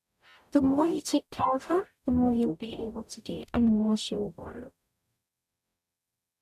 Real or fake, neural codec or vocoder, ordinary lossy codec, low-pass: fake; codec, 44.1 kHz, 0.9 kbps, DAC; none; 14.4 kHz